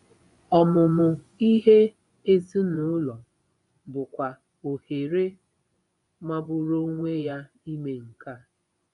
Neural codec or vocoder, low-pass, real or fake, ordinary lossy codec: vocoder, 24 kHz, 100 mel bands, Vocos; 10.8 kHz; fake; MP3, 96 kbps